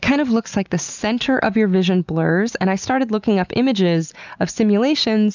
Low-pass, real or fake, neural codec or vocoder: 7.2 kHz; real; none